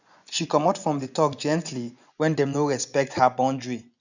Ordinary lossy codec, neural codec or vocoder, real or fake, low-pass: none; vocoder, 24 kHz, 100 mel bands, Vocos; fake; 7.2 kHz